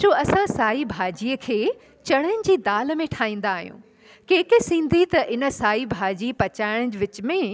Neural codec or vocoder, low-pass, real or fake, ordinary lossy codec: none; none; real; none